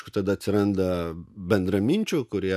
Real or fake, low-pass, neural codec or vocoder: real; 14.4 kHz; none